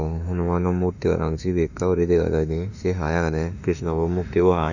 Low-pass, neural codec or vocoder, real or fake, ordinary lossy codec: 7.2 kHz; autoencoder, 48 kHz, 32 numbers a frame, DAC-VAE, trained on Japanese speech; fake; none